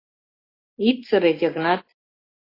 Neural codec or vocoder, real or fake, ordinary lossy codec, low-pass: none; real; AAC, 24 kbps; 5.4 kHz